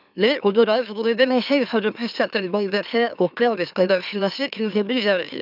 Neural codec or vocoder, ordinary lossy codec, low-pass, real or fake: autoencoder, 44.1 kHz, a latent of 192 numbers a frame, MeloTTS; none; 5.4 kHz; fake